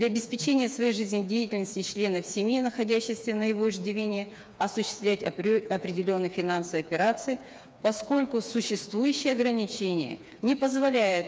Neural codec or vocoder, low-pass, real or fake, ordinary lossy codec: codec, 16 kHz, 4 kbps, FreqCodec, smaller model; none; fake; none